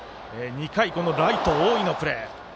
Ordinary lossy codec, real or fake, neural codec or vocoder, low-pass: none; real; none; none